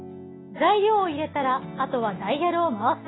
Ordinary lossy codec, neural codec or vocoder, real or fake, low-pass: AAC, 16 kbps; none; real; 7.2 kHz